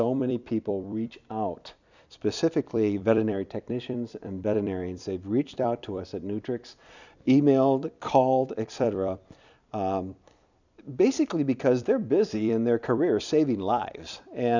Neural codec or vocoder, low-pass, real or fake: vocoder, 44.1 kHz, 128 mel bands every 256 samples, BigVGAN v2; 7.2 kHz; fake